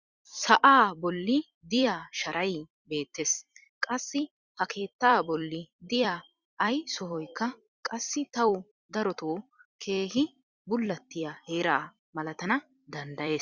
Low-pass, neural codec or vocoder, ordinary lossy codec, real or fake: 7.2 kHz; vocoder, 44.1 kHz, 128 mel bands every 256 samples, BigVGAN v2; Opus, 64 kbps; fake